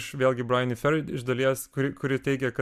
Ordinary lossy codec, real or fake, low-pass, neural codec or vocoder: MP3, 96 kbps; real; 14.4 kHz; none